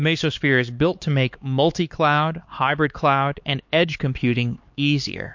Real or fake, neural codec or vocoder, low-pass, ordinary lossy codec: fake; codec, 16 kHz, 4 kbps, X-Codec, HuBERT features, trained on LibriSpeech; 7.2 kHz; MP3, 48 kbps